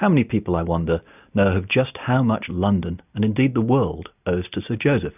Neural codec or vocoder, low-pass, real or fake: none; 3.6 kHz; real